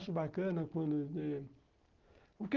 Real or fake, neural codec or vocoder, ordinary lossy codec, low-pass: real; none; Opus, 16 kbps; 7.2 kHz